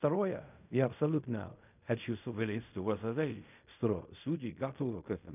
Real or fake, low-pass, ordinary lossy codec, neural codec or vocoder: fake; 3.6 kHz; none; codec, 16 kHz in and 24 kHz out, 0.4 kbps, LongCat-Audio-Codec, fine tuned four codebook decoder